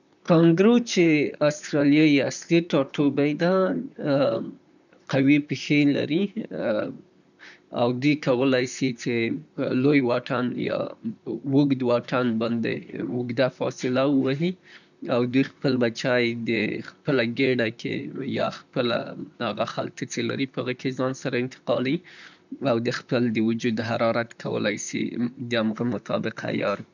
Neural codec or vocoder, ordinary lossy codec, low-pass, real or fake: vocoder, 44.1 kHz, 128 mel bands, Pupu-Vocoder; none; 7.2 kHz; fake